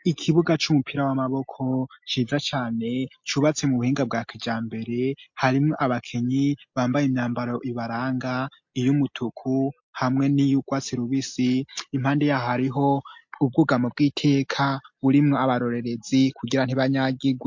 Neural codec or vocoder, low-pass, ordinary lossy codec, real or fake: none; 7.2 kHz; MP3, 48 kbps; real